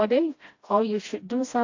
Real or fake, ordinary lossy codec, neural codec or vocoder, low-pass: fake; AAC, 48 kbps; codec, 16 kHz, 1 kbps, FreqCodec, smaller model; 7.2 kHz